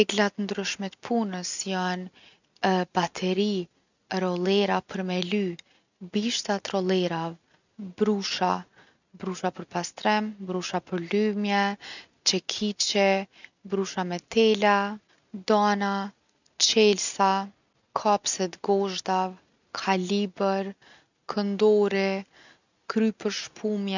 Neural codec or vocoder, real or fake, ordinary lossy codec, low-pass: none; real; none; 7.2 kHz